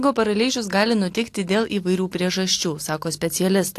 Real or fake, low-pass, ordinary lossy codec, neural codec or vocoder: real; 14.4 kHz; AAC, 64 kbps; none